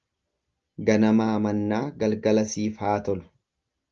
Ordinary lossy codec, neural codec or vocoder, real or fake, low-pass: Opus, 32 kbps; none; real; 7.2 kHz